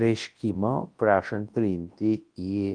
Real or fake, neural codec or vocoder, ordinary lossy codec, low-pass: fake; codec, 24 kHz, 0.9 kbps, WavTokenizer, large speech release; Opus, 24 kbps; 9.9 kHz